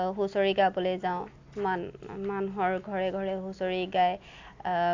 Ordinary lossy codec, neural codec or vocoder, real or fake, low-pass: AAC, 48 kbps; none; real; 7.2 kHz